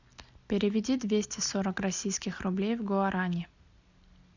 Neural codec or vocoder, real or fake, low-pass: none; real; 7.2 kHz